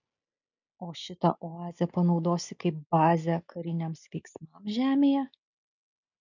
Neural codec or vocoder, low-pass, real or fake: none; 7.2 kHz; real